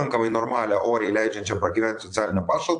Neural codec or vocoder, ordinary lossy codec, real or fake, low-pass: vocoder, 22.05 kHz, 80 mel bands, Vocos; AAC, 64 kbps; fake; 9.9 kHz